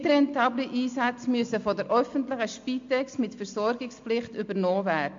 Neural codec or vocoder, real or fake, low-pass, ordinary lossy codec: none; real; 7.2 kHz; MP3, 48 kbps